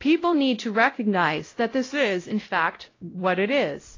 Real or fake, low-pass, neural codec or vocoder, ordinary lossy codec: fake; 7.2 kHz; codec, 16 kHz, 0.5 kbps, X-Codec, WavLM features, trained on Multilingual LibriSpeech; AAC, 32 kbps